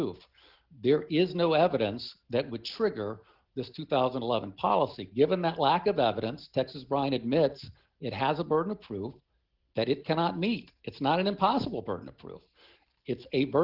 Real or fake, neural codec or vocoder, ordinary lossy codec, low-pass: real; none; Opus, 16 kbps; 5.4 kHz